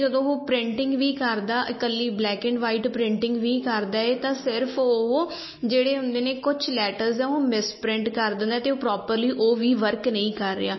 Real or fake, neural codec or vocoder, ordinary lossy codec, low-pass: real; none; MP3, 24 kbps; 7.2 kHz